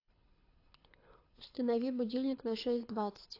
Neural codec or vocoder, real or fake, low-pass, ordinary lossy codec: codec, 24 kHz, 6 kbps, HILCodec; fake; 5.4 kHz; AAC, 32 kbps